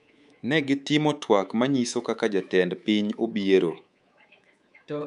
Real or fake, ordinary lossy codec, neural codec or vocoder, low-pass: fake; none; codec, 24 kHz, 3.1 kbps, DualCodec; 10.8 kHz